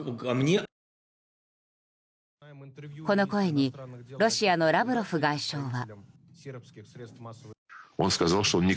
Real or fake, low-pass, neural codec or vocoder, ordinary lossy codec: real; none; none; none